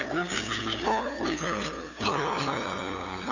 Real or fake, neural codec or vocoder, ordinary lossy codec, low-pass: fake; codec, 16 kHz, 2 kbps, FunCodec, trained on LibriTTS, 25 frames a second; none; 7.2 kHz